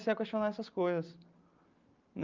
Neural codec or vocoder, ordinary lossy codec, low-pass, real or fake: none; Opus, 24 kbps; 7.2 kHz; real